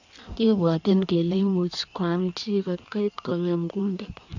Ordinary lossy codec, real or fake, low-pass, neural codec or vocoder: none; fake; 7.2 kHz; codec, 16 kHz in and 24 kHz out, 1.1 kbps, FireRedTTS-2 codec